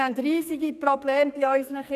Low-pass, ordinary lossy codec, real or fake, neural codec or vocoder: 14.4 kHz; none; fake; codec, 44.1 kHz, 2.6 kbps, SNAC